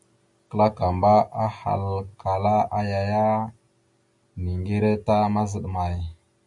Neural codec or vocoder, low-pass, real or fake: none; 10.8 kHz; real